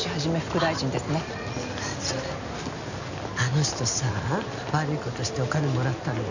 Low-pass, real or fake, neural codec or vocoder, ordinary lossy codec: 7.2 kHz; real; none; none